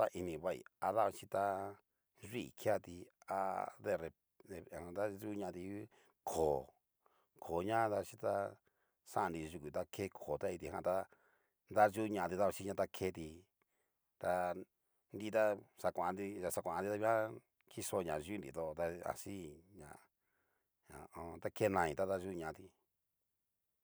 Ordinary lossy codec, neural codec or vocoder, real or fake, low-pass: none; none; real; none